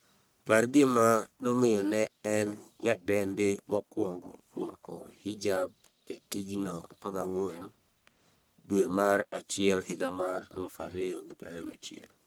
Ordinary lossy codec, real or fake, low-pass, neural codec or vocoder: none; fake; none; codec, 44.1 kHz, 1.7 kbps, Pupu-Codec